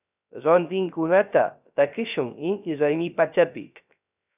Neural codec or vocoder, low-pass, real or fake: codec, 16 kHz, 0.3 kbps, FocalCodec; 3.6 kHz; fake